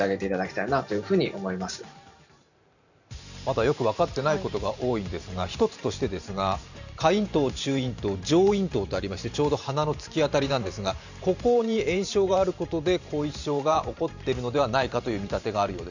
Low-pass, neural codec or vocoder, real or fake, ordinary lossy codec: 7.2 kHz; vocoder, 44.1 kHz, 128 mel bands every 512 samples, BigVGAN v2; fake; none